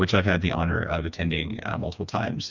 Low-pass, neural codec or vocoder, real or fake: 7.2 kHz; codec, 16 kHz, 2 kbps, FreqCodec, smaller model; fake